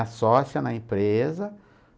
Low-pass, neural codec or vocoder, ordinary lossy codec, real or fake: none; none; none; real